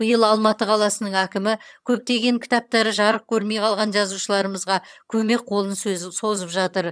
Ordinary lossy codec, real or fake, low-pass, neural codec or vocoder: none; fake; none; vocoder, 22.05 kHz, 80 mel bands, HiFi-GAN